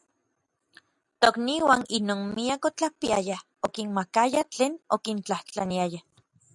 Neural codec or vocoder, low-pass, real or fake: none; 10.8 kHz; real